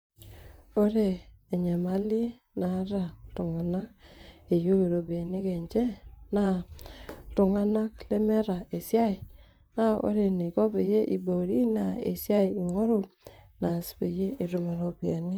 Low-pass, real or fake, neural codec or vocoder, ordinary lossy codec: none; fake; vocoder, 44.1 kHz, 128 mel bands, Pupu-Vocoder; none